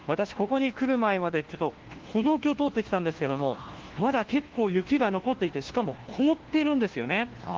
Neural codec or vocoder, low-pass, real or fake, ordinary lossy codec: codec, 16 kHz, 1 kbps, FunCodec, trained on LibriTTS, 50 frames a second; 7.2 kHz; fake; Opus, 16 kbps